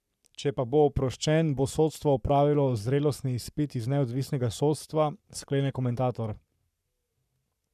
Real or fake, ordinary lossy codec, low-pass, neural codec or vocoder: fake; none; 14.4 kHz; codec, 44.1 kHz, 7.8 kbps, Pupu-Codec